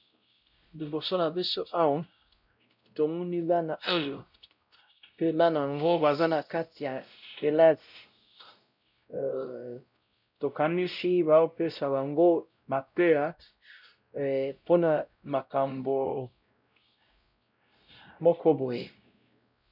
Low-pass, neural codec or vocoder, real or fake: 5.4 kHz; codec, 16 kHz, 0.5 kbps, X-Codec, WavLM features, trained on Multilingual LibriSpeech; fake